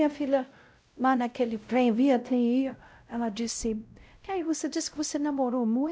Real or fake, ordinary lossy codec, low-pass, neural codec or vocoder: fake; none; none; codec, 16 kHz, 0.5 kbps, X-Codec, WavLM features, trained on Multilingual LibriSpeech